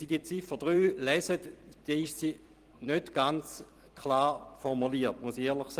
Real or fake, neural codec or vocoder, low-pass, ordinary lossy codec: fake; vocoder, 44.1 kHz, 128 mel bands every 256 samples, BigVGAN v2; 14.4 kHz; Opus, 24 kbps